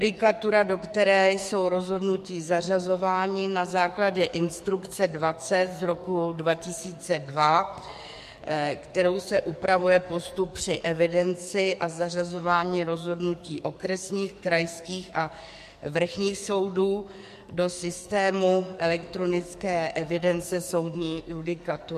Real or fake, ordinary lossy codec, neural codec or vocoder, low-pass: fake; MP3, 64 kbps; codec, 44.1 kHz, 2.6 kbps, SNAC; 14.4 kHz